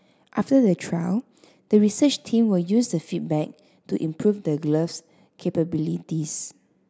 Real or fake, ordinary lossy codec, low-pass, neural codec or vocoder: real; none; none; none